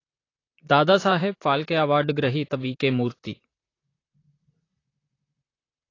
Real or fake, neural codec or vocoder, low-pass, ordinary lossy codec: fake; vocoder, 44.1 kHz, 128 mel bands, Pupu-Vocoder; 7.2 kHz; AAC, 32 kbps